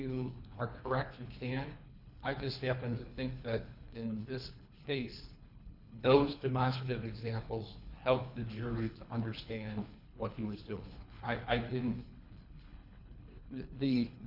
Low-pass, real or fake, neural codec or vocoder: 5.4 kHz; fake; codec, 24 kHz, 3 kbps, HILCodec